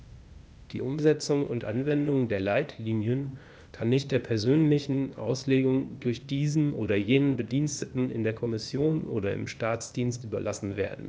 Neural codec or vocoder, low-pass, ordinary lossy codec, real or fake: codec, 16 kHz, 0.8 kbps, ZipCodec; none; none; fake